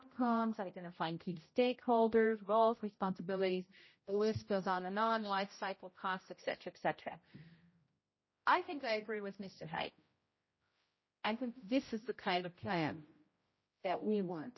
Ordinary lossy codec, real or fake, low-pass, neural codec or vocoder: MP3, 24 kbps; fake; 7.2 kHz; codec, 16 kHz, 0.5 kbps, X-Codec, HuBERT features, trained on general audio